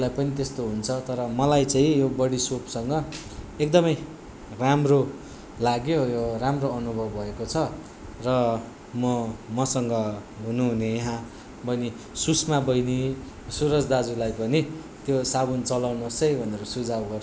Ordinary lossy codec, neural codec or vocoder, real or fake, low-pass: none; none; real; none